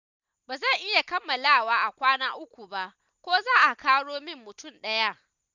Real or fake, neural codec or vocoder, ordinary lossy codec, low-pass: real; none; none; 7.2 kHz